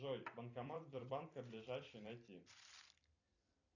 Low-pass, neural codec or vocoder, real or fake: 7.2 kHz; vocoder, 44.1 kHz, 128 mel bands every 256 samples, BigVGAN v2; fake